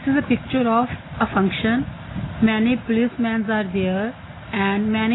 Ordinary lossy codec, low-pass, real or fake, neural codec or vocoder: AAC, 16 kbps; 7.2 kHz; real; none